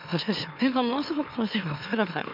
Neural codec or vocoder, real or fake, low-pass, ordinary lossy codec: autoencoder, 44.1 kHz, a latent of 192 numbers a frame, MeloTTS; fake; 5.4 kHz; none